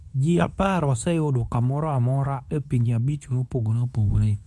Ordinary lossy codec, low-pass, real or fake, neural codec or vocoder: none; none; fake; codec, 24 kHz, 0.9 kbps, WavTokenizer, medium speech release version 2